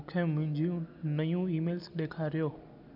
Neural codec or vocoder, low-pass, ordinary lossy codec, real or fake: none; 5.4 kHz; none; real